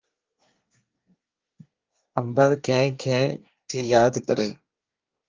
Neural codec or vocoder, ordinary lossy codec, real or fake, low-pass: codec, 24 kHz, 1 kbps, SNAC; Opus, 24 kbps; fake; 7.2 kHz